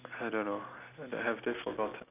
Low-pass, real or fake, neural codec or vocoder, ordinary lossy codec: 3.6 kHz; real; none; none